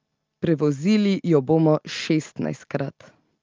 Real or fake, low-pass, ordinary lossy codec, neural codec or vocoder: real; 7.2 kHz; Opus, 24 kbps; none